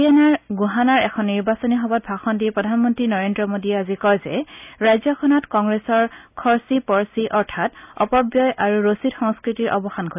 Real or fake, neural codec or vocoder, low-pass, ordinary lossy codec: real; none; 3.6 kHz; none